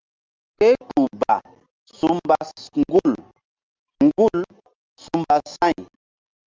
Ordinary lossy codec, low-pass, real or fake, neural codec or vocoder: Opus, 24 kbps; 7.2 kHz; real; none